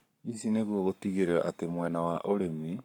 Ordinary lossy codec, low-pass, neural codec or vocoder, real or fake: none; 19.8 kHz; codec, 44.1 kHz, 7.8 kbps, Pupu-Codec; fake